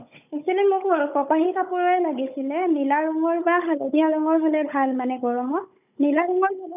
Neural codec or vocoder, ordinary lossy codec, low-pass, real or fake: codec, 16 kHz, 16 kbps, FunCodec, trained on Chinese and English, 50 frames a second; none; 3.6 kHz; fake